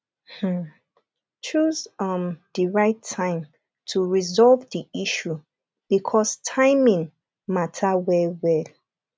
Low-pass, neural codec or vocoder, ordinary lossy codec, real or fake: none; none; none; real